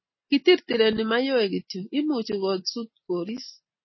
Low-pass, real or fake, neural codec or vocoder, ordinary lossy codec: 7.2 kHz; real; none; MP3, 24 kbps